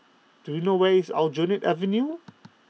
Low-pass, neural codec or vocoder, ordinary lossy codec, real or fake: none; none; none; real